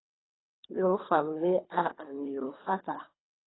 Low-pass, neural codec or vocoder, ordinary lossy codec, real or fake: 7.2 kHz; codec, 24 kHz, 3 kbps, HILCodec; AAC, 16 kbps; fake